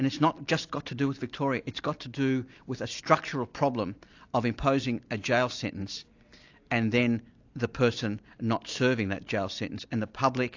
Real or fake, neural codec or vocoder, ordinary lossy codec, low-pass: real; none; AAC, 48 kbps; 7.2 kHz